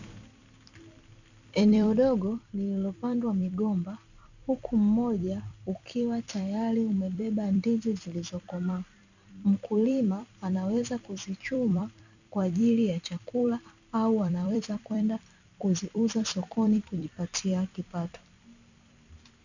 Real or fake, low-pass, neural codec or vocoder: fake; 7.2 kHz; vocoder, 44.1 kHz, 128 mel bands every 256 samples, BigVGAN v2